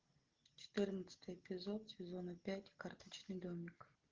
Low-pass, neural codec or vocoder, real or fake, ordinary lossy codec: 7.2 kHz; none; real; Opus, 16 kbps